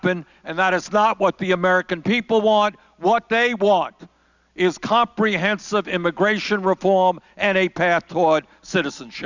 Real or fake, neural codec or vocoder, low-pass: real; none; 7.2 kHz